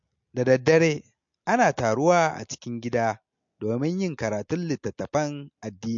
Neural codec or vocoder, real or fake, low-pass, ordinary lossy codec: none; real; 7.2 kHz; MP3, 48 kbps